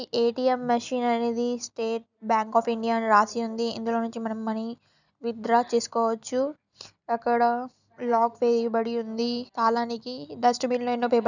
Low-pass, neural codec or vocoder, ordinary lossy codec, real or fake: 7.2 kHz; none; none; real